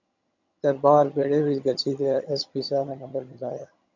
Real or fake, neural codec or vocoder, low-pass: fake; vocoder, 22.05 kHz, 80 mel bands, HiFi-GAN; 7.2 kHz